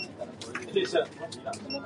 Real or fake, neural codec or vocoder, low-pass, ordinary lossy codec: real; none; 10.8 kHz; MP3, 96 kbps